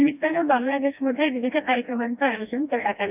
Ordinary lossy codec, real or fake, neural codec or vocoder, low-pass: none; fake; codec, 16 kHz, 1 kbps, FreqCodec, smaller model; 3.6 kHz